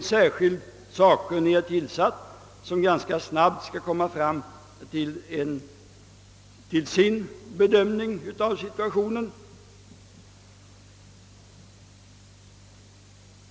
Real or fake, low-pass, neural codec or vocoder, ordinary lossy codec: real; none; none; none